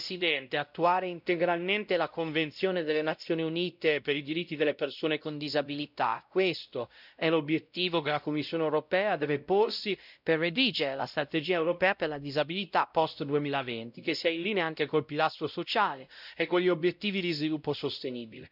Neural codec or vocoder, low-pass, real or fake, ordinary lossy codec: codec, 16 kHz, 0.5 kbps, X-Codec, WavLM features, trained on Multilingual LibriSpeech; 5.4 kHz; fake; none